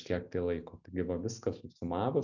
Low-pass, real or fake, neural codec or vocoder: 7.2 kHz; real; none